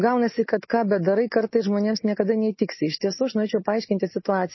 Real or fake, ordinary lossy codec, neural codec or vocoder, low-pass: real; MP3, 24 kbps; none; 7.2 kHz